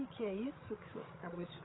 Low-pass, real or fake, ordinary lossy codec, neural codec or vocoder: 7.2 kHz; fake; AAC, 16 kbps; codec, 16 kHz, 16 kbps, FreqCodec, larger model